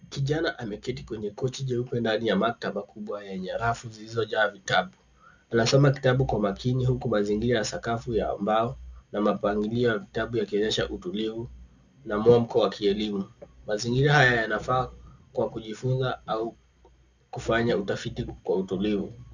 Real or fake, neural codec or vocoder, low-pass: real; none; 7.2 kHz